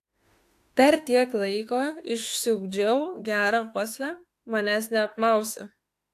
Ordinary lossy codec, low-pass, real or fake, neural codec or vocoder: AAC, 64 kbps; 14.4 kHz; fake; autoencoder, 48 kHz, 32 numbers a frame, DAC-VAE, trained on Japanese speech